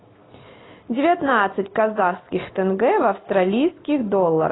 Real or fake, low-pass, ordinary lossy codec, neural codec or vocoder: real; 7.2 kHz; AAC, 16 kbps; none